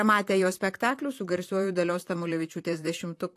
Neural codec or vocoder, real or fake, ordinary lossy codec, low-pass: vocoder, 44.1 kHz, 128 mel bands, Pupu-Vocoder; fake; AAC, 64 kbps; 14.4 kHz